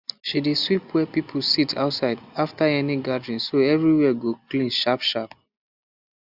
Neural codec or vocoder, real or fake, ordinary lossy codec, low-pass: none; real; Opus, 64 kbps; 5.4 kHz